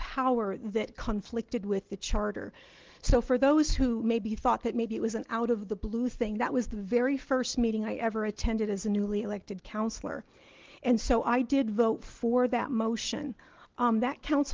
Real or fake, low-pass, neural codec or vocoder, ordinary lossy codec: real; 7.2 kHz; none; Opus, 16 kbps